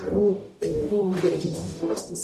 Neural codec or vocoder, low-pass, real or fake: codec, 44.1 kHz, 0.9 kbps, DAC; 14.4 kHz; fake